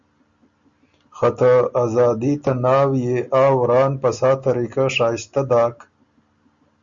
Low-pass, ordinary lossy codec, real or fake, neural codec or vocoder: 7.2 kHz; Opus, 64 kbps; real; none